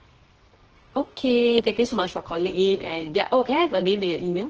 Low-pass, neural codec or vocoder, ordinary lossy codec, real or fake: 7.2 kHz; codec, 24 kHz, 0.9 kbps, WavTokenizer, medium music audio release; Opus, 16 kbps; fake